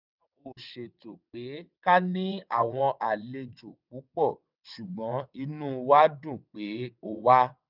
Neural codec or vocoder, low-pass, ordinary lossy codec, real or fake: vocoder, 22.05 kHz, 80 mel bands, WaveNeXt; 5.4 kHz; none; fake